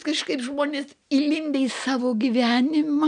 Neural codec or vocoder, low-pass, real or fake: none; 9.9 kHz; real